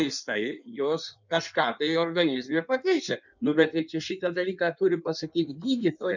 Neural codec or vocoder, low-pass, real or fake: codec, 16 kHz in and 24 kHz out, 1.1 kbps, FireRedTTS-2 codec; 7.2 kHz; fake